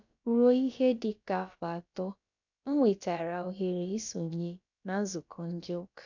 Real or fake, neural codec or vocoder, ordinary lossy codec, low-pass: fake; codec, 16 kHz, about 1 kbps, DyCAST, with the encoder's durations; none; 7.2 kHz